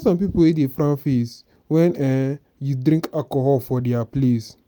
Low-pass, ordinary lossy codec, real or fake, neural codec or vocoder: none; none; real; none